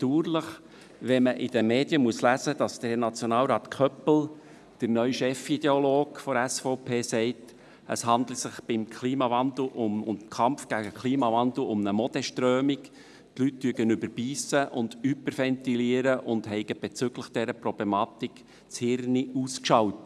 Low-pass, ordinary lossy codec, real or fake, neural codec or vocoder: none; none; real; none